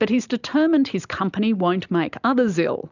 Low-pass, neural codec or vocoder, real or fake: 7.2 kHz; none; real